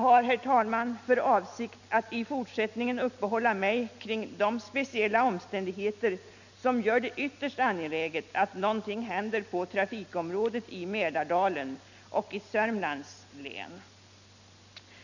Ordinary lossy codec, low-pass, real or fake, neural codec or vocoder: none; 7.2 kHz; real; none